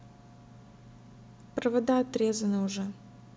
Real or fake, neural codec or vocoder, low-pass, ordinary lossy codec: real; none; none; none